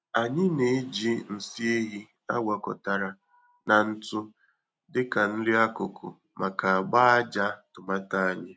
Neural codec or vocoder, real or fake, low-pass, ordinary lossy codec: none; real; none; none